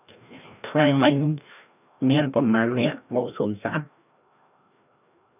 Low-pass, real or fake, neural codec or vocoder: 3.6 kHz; fake; codec, 16 kHz, 1 kbps, FreqCodec, larger model